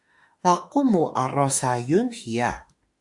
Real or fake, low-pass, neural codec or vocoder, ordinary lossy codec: fake; 10.8 kHz; autoencoder, 48 kHz, 32 numbers a frame, DAC-VAE, trained on Japanese speech; Opus, 64 kbps